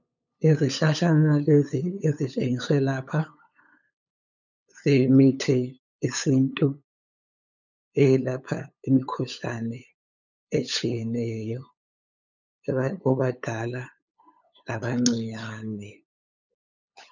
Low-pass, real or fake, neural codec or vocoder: 7.2 kHz; fake; codec, 16 kHz, 8 kbps, FunCodec, trained on LibriTTS, 25 frames a second